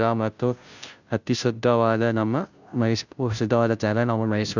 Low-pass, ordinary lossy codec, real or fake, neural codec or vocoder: 7.2 kHz; none; fake; codec, 16 kHz, 0.5 kbps, FunCodec, trained on Chinese and English, 25 frames a second